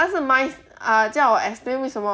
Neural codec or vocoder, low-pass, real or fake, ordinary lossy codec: none; none; real; none